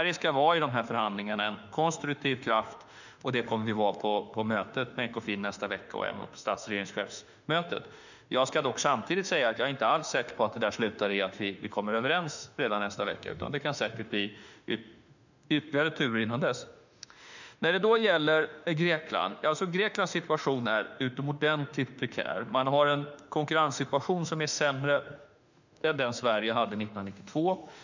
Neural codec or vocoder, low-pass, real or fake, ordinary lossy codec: autoencoder, 48 kHz, 32 numbers a frame, DAC-VAE, trained on Japanese speech; 7.2 kHz; fake; none